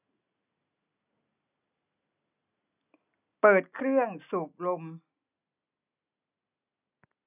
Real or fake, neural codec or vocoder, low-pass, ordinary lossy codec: real; none; 3.6 kHz; none